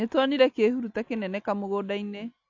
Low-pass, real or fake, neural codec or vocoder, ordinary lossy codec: 7.2 kHz; real; none; AAC, 48 kbps